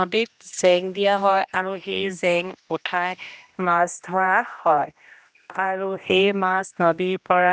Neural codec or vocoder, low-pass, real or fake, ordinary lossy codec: codec, 16 kHz, 1 kbps, X-Codec, HuBERT features, trained on general audio; none; fake; none